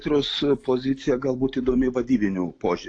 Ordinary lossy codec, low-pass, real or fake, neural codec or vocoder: AAC, 48 kbps; 9.9 kHz; real; none